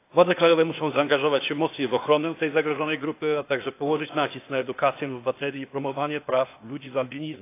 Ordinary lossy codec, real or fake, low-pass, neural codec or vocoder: AAC, 24 kbps; fake; 3.6 kHz; codec, 16 kHz, 0.8 kbps, ZipCodec